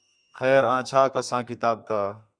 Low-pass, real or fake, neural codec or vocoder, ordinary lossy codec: 9.9 kHz; fake; codec, 32 kHz, 1.9 kbps, SNAC; Opus, 64 kbps